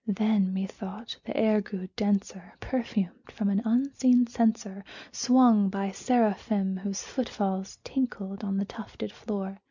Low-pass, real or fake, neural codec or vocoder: 7.2 kHz; real; none